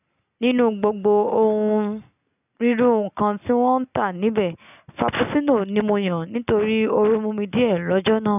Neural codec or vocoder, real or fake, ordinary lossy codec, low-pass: none; real; none; 3.6 kHz